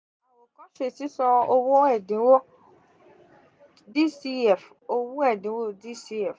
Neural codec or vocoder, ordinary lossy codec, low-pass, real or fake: none; none; none; real